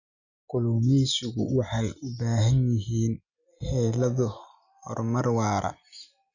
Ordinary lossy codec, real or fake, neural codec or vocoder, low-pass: none; real; none; 7.2 kHz